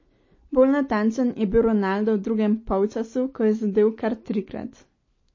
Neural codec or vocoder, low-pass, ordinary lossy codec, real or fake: none; 7.2 kHz; MP3, 32 kbps; real